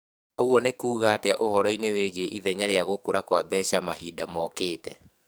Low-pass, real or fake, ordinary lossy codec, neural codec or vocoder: none; fake; none; codec, 44.1 kHz, 2.6 kbps, SNAC